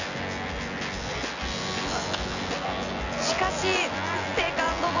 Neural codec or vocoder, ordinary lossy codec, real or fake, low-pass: vocoder, 24 kHz, 100 mel bands, Vocos; none; fake; 7.2 kHz